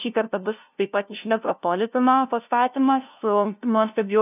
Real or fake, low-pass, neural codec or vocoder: fake; 3.6 kHz; codec, 16 kHz, 0.5 kbps, FunCodec, trained on LibriTTS, 25 frames a second